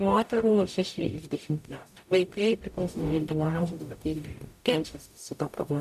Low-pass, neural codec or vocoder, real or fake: 14.4 kHz; codec, 44.1 kHz, 0.9 kbps, DAC; fake